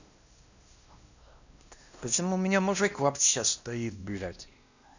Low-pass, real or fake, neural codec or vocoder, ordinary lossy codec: 7.2 kHz; fake; codec, 16 kHz, 1 kbps, X-Codec, WavLM features, trained on Multilingual LibriSpeech; none